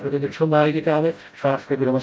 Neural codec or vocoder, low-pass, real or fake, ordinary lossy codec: codec, 16 kHz, 0.5 kbps, FreqCodec, smaller model; none; fake; none